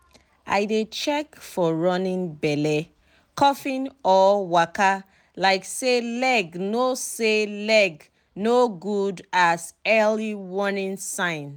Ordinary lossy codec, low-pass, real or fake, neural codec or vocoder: none; none; real; none